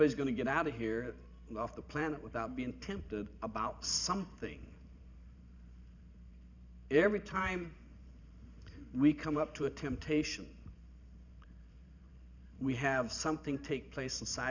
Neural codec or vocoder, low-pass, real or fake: vocoder, 44.1 kHz, 128 mel bands every 512 samples, BigVGAN v2; 7.2 kHz; fake